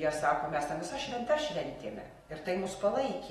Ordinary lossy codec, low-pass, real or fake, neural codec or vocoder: AAC, 32 kbps; 19.8 kHz; real; none